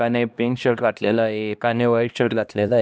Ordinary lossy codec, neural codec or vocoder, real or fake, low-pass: none; codec, 16 kHz, 1 kbps, X-Codec, HuBERT features, trained on LibriSpeech; fake; none